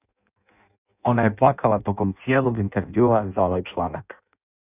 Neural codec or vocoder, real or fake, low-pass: codec, 16 kHz in and 24 kHz out, 0.6 kbps, FireRedTTS-2 codec; fake; 3.6 kHz